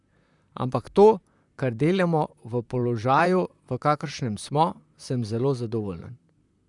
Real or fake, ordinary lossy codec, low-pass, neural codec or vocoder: fake; none; 10.8 kHz; vocoder, 44.1 kHz, 128 mel bands, Pupu-Vocoder